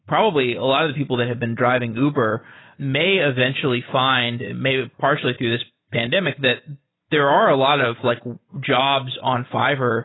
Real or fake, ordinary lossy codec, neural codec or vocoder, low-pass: real; AAC, 16 kbps; none; 7.2 kHz